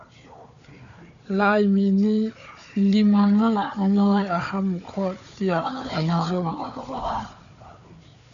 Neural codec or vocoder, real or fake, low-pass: codec, 16 kHz, 4 kbps, FunCodec, trained on Chinese and English, 50 frames a second; fake; 7.2 kHz